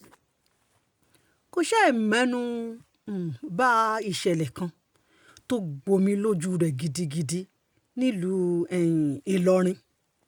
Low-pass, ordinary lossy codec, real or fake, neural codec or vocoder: none; none; real; none